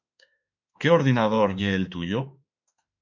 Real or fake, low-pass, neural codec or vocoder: fake; 7.2 kHz; autoencoder, 48 kHz, 32 numbers a frame, DAC-VAE, trained on Japanese speech